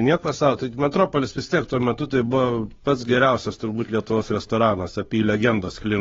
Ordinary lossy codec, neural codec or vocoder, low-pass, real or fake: AAC, 24 kbps; codec, 16 kHz, 2 kbps, FunCodec, trained on Chinese and English, 25 frames a second; 7.2 kHz; fake